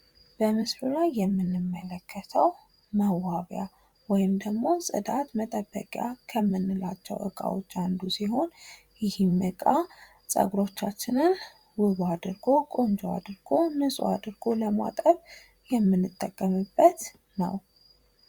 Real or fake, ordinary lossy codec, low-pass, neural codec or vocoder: fake; Opus, 64 kbps; 19.8 kHz; vocoder, 44.1 kHz, 128 mel bands every 512 samples, BigVGAN v2